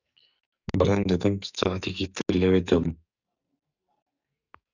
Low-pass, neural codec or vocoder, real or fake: 7.2 kHz; codec, 44.1 kHz, 2.6 kbps, SNAC; fake